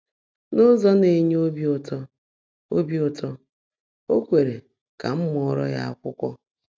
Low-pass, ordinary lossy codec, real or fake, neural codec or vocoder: none; none; real; none